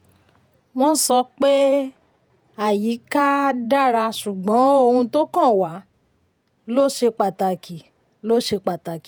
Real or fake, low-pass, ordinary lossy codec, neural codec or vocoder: fake; none; none; vocoder, 48 kHz, 128 mel bands, Vocos